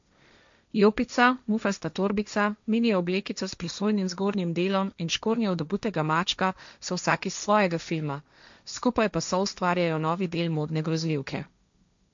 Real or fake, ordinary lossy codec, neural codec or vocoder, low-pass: fake; MP3, 64 kbps; codec, 16 kHz, 1.1 kbps, Voila-Tokenizer; 7.2 kHz